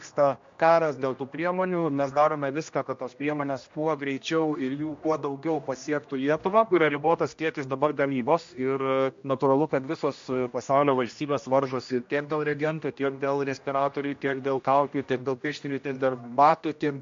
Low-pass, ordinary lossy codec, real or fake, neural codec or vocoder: 7.2 kHz; MP3, 48 kbps; fake; codec, 16 kHz, 1 kbps, X-Codec, HuBERT features, trained on general audio